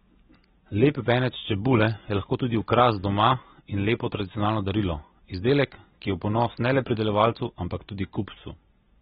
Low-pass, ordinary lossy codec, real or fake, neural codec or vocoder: 19.8 kHz; AAC, 16 kbps; real; none